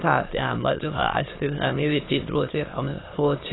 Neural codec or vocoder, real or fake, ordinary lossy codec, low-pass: autoencoder, 22.05 kHz, a latent of 192 numbers a frame, VITS, trained on many speakers; fake; AAC, 16 kbps; 7.2 kHz